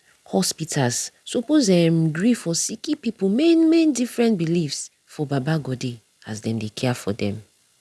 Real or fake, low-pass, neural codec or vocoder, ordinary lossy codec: real; none; none; none